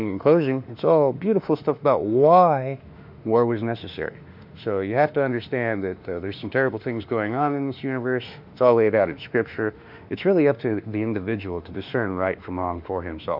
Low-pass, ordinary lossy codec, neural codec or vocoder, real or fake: 5.4 kHz; MP3, 48 kbps; autoencoder, 48 kHz, 32 numbers a frame, DAC-VAE, trained on Japanese speech; fake